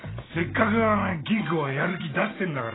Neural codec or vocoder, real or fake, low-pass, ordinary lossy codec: none; real; 7.2 kHz; AAC, 16 kbps